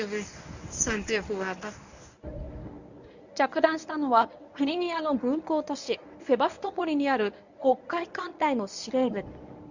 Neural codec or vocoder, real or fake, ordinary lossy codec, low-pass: codec, 24 kHz, 0.9 kbps, WavTokenizer, medium speech release version 1; fake; none; 7.2 kHz